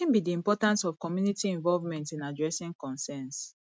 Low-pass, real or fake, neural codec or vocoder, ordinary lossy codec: none; real; none; none